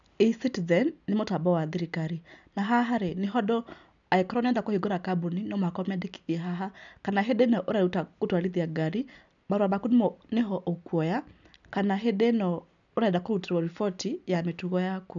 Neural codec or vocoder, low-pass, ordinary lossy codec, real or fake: none; 7.2 kHz; none; real